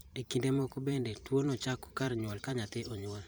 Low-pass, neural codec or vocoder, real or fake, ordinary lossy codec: none; vocoder, 44.1 kHz, 128 mel bands every 512 samples, BigVGAN v2; fake; none